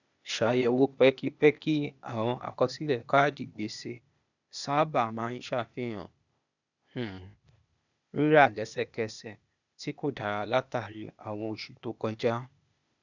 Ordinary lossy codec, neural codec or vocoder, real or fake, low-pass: none; codec, 16 kHz, 0.8 kbps, ZipCodec; fake; 7.2 kHz